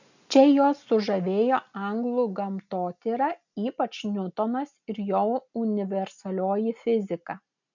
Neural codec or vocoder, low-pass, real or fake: none; 7.2 kHz; real